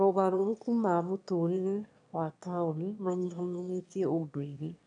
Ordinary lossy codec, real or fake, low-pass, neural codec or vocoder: none; fake; 9.9 kHz; autoencoder, 22.05 kHz, a latent of 192 numbers a frame, VITS, trained on one speaker